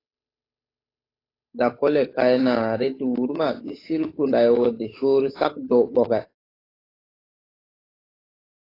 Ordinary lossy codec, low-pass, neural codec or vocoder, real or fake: AAC, 24 kbps; 5.4 kHz; codec, 16 kHz, 8 kbps, FunCodec, trained on Chinese and English, 25 frames a second; fake